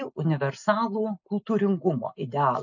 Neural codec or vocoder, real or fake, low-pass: none; real; 7.2 kHz